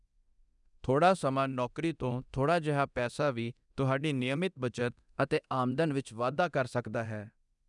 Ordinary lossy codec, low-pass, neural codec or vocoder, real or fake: none; 10.8 kHz; codec, 24 kHz, 0.9 kbps, DualCodec; fake